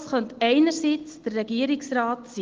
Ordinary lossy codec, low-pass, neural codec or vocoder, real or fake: Opus, 16 kbps; 7.2 kHz; none; real